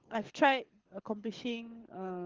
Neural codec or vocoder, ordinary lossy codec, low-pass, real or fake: codec, 24 kHz, 6 kbps, HILCodec; Opus, 16 kbps; 7.2 kHz; fake